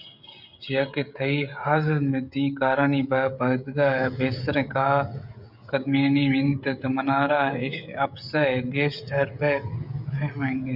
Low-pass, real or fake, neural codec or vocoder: 5.4 kHz; fake; codec, 16 kHz, 16 kbps, FreqCodec, smaller model